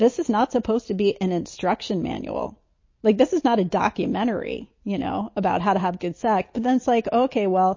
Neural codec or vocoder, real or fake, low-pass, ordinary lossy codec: none; real; 7.2 kHz; MP3, 32 kbps